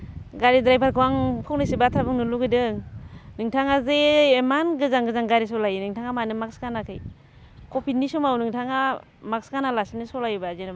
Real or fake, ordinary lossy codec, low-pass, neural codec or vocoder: real; none; none; none